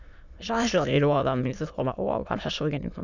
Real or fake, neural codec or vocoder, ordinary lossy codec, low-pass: fake; autoencoder, 22.05 kHz, a latent of 192 numbers a frame, VITS, trained on many speakers; none; 7.2 kHz